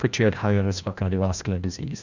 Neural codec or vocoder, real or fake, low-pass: codec, 16 kHz, 1 kbps, FreqCodec, larger model; fake; 7.2 kHz